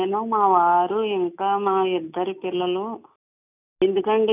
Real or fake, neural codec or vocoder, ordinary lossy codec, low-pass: real; none; none; 3.6 kHz